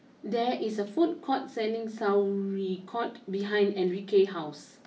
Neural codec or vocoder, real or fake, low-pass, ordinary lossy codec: none; real; none; none